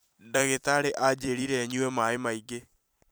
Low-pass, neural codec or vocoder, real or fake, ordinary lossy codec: none; vocoder, 44.1 kHz, 128 mel bands every 256 samples, BigVGAN v2; fake; none